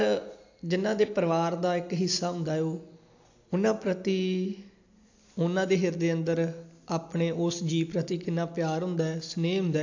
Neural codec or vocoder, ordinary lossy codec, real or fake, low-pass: none; AAC, 48 kbps; real; 7.2 kHz